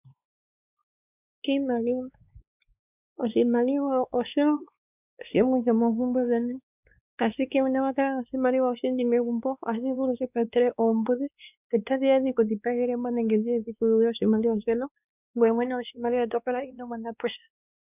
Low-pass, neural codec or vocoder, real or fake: 3.6 kHz; codec, 16 kHz, 2 kbps, X-Codec, WavLM features, trained on Multilingual LibriSpeech; fake